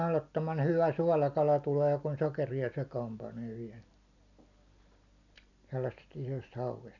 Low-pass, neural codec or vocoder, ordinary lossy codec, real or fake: 7.2 kHz; none; none; real